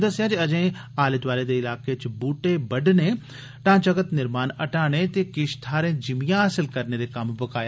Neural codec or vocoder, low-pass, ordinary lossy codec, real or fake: none; none; none; real